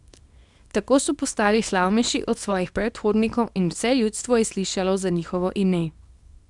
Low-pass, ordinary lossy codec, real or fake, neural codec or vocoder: 10.8 kHz; none; fake; codec, 24 kHz, 0.9 kbps, WavTokenizer, small release